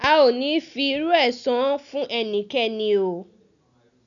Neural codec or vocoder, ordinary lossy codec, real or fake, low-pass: none; none; real; 7.2 kHz